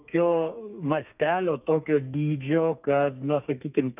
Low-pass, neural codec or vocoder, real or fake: 3.6 kHz; codec, 32 kHz, 1.9 kbps, SNAC; fake